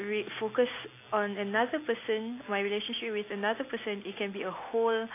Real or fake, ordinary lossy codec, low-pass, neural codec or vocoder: real; AAC, 24 kbps; 3.6 kHz; none